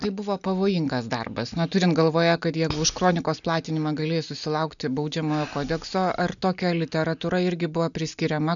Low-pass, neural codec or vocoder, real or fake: 7.2 kHz; none; real